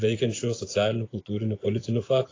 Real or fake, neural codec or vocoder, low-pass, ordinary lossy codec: fake; vocoder, 24 kHz, 100 mel bands, Vocos; 7.2 kHz; AAC, 32 kbps